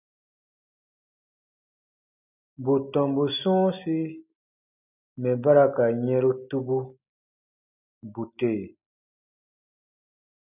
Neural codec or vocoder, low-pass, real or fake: none; 3.6 kHz; real